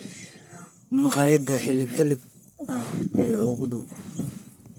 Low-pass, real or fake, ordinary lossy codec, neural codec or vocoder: none; fake; none; codec, 44.1 kHz, 1.7 kbps, Pupu-Codec